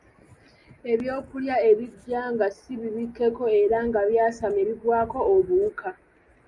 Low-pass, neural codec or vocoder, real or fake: 10.8 kHz; none; real